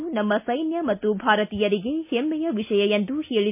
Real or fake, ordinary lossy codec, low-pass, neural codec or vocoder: real; MP3, 32 kbps; 3.6 kHz; none